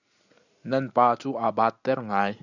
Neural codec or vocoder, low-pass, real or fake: none; 7.2 kHz; real